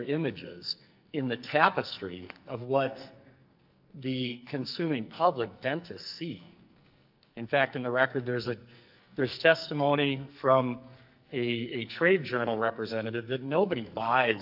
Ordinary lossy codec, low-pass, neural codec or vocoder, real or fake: AAC, 48 kbps; 5.4 kHz; codec, 44.1 kHz, 2.6 kbps, SNAC; fake